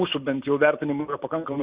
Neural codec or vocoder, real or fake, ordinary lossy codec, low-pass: none; real; Opus, 64 kbps; 3.6 kHz